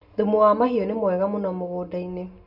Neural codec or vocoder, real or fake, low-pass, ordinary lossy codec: none; real; 5.4 kHz; none